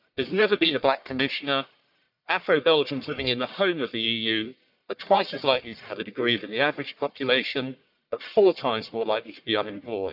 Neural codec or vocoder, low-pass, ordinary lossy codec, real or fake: codec, 44.1 kHz, 1.7 kbps, Pupu-Codec; 5.4 kHz; none; fake